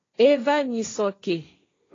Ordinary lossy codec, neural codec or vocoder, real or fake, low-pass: AAC, 32 kbps; codec, 16 kHz, 1.1 kbps, Voila-Tokenizer; fake; 7.2 kHz